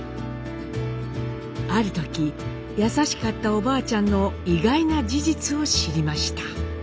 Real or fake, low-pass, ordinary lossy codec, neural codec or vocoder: real; none; none; none